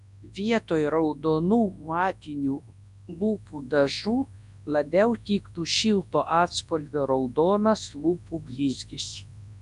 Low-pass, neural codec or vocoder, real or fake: 10.8 kHz; codec, 24 kHz, 0.9 kbps, WavTokenizer, large speech release; fake